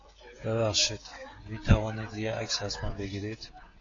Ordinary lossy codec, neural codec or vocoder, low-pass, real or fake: AAC, 32 kbps; codec, 16 kHz, 16 kbps, FreqCodec, smaller model; 7.2 kHz; fake